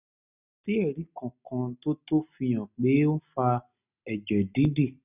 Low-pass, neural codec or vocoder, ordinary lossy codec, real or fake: 3.6 kHz; none; none; real